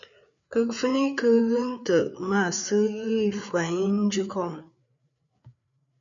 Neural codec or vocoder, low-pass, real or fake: codec, 16 kHz, 4 kbps, FreqCodec, larger model; 7.2 kHz; fake